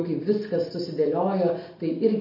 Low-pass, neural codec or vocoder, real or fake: 5.4 kHz; none; real